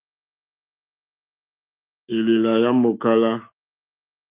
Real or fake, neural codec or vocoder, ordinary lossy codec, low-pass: fake; autoencoder, 48 kHz, 128 numbers a frame, DAC-VAE, trained on Japanese speech; Opus, 24 kbps; 3.6 kHz